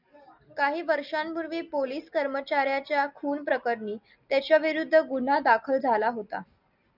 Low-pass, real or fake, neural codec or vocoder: 5.4 kHz; real; none